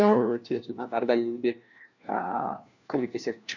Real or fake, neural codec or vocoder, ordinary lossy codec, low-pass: fake; codec, 16 kHz, 1 kbps, FunCodec, trained on LibriTTS, 50 frames a second; none; 7.2 kHz